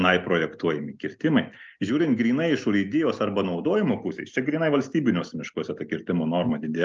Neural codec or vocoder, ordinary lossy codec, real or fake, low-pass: none; Opus, 24 kbps; real; 7.2 kHz